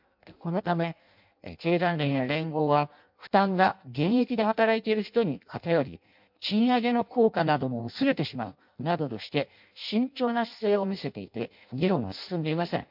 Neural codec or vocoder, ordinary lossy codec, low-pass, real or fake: codec, 16 kHz in and 24 kHz out, 0.6 kbps, FireRedTTS-2 codec; MP3, 48 kbps; 5.4 kHz; fake